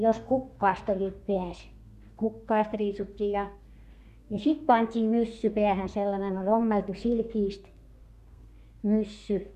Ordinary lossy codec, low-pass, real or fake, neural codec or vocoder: none; 14.4 kHz; fake; codec, 32 kHz, 1.9 kbps, SNAC